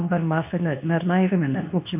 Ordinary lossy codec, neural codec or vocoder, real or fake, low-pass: none; codec, 24 kHz, 0.9 kbps, WavTokenizer, medium speech release version 2; fake; 3.6 kHz